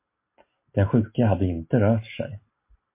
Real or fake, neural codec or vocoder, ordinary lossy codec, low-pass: real; none; MP3, 24 kbps; 3.6 kHz